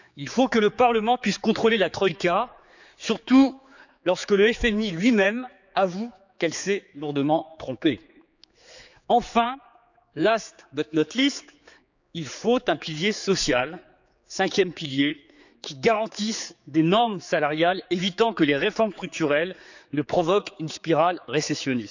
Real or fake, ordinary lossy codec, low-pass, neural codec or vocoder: fake; none; 7.2 kHz; codec, 16 kHz, 4 kbps, X-Codec, HuBERT features, trained on general audio